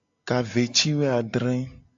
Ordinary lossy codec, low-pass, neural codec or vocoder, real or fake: AAC, 64 kbps; 7.2 kHz; none; real